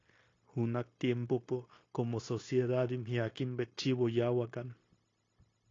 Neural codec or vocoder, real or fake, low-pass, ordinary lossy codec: codec, 16 kHz, 0.9 kbps, LongCat-Audio-Codec; fake; 7.2 kHz; AAC, 32 kbps